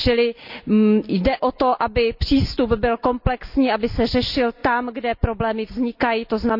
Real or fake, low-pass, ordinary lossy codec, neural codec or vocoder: real; 5.4 kHz; none; none